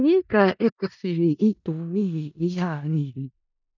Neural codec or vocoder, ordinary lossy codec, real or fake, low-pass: codec, 16 kHz in and 24 kHz out, 0.4 kbps, LongCat-Audio-Codec, four codebook decoder; none; fake; 7.2 kHz